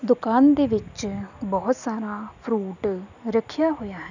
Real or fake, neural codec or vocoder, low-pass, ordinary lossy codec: real; none; 7.2 kHz; none